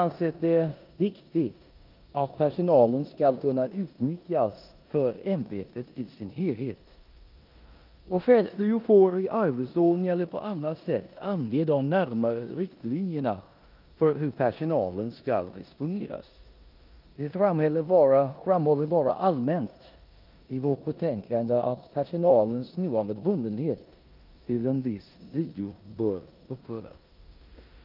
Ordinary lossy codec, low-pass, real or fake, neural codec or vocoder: Opus, 24 kbps; 5.4 kHz; fake; codec, 16 kHz in and 24 kHz out, 0.9 kbps, LongCat-Audio-Codec, four codebook decoder